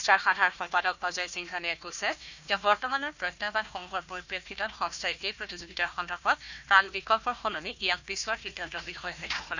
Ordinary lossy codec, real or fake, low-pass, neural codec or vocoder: none; fake; 7.2 kHz; codec, 16 kHz, 1 kbps, FunCodec, trained on Chinese and English, 50 frames a second